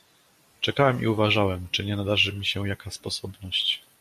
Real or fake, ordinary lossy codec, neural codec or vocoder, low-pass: real; MP3, 64 kbps; none; 14.4 kHz